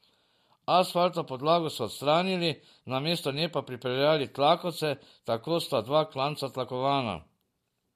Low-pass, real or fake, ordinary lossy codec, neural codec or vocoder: 14.4 kHz; real; MP3, 64 kbps; none